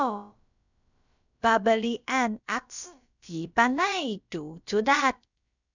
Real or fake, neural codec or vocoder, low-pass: fake; codec, 16 kHz, about 1 kbps, DyCAST, with the encoder's durations; 7.2 kHz